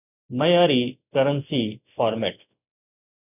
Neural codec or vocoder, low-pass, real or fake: none; 3.6 kHz; real